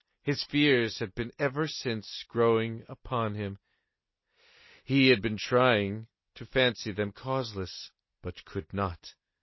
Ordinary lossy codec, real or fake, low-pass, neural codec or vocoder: MP3, 24 kbps; real; 7.2 kHz; none